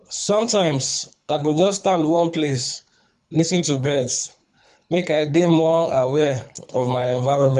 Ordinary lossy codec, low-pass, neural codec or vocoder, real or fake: none; 10.8 kHz; codec, 24 kHz, 3 kbps, HILCodec; fake